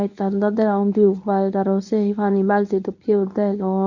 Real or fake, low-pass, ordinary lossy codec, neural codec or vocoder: fake; 7.2 kHz; none; codec, 24 kHz, 0.9 kbps, WavTokenizer, medium speech release version 1